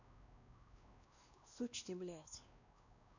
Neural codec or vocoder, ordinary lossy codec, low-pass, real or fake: codec, 16 kHz, 1 kbps, X-Codec, WavLM features, trained on Multilingual LibriSpeech; none; 7.2 kHz; fake